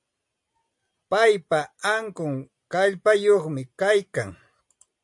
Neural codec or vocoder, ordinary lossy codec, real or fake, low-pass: none; MP3, 64 kbps; real; 10.8 kHz